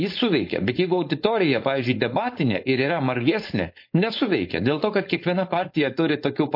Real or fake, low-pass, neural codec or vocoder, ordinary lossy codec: fake; 5.4 kHz; codec, 16 kHz, 4.8 kbps, FACodec; MP3, 32 kbps